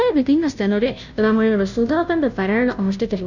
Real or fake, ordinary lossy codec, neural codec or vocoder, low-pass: fake; none; codec, 16 kHz, 0.5 kbps, FunCodec, trained on Chinese and English, 25 frames a second; 7.2 kHz